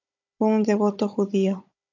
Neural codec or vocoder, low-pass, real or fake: codec, 16 kHz, 16 kbps, FunCodec, trained on Chinese and English, 50 frames a second; 7.2 kHz; fake